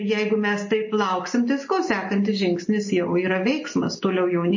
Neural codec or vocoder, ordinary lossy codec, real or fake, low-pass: none; MP3, 32 kbps; real; 7.2 kHz